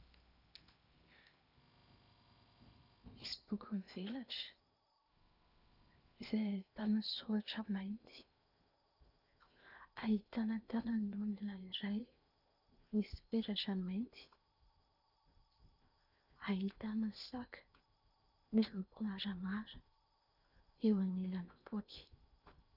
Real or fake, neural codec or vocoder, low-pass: fake; codec, 16 kHz in and 24 kHz out, 0.8 kbps, FocalCodec, streaming, 65536 codes; 5.4 kHz